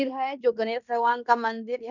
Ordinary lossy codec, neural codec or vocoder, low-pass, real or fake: none; codec, 16 kHz in and 24 kHz out, 0.9 kbps, LongCat-Audio-Codec, fine tuned four codebook decoder; 7.2 kHz; fake